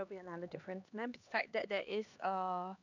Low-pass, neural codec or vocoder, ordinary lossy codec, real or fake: 7.2 kHz; codec, 16 kHz, 2 kbps, X-Codec, HuBERT features, trained on LibriSpeech; none; fake